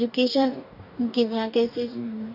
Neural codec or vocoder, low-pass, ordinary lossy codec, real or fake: codec, 44.1 kHz, 2.6 kbps, DAC; 5.4 kHz; none; fake